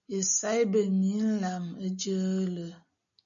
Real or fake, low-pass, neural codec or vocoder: real; 7.2 kHz; none